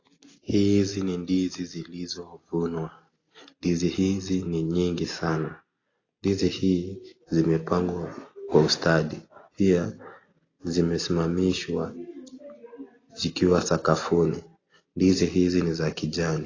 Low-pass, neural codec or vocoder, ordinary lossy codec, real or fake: 7.2 kHz; none; AAC, 32 kbps; real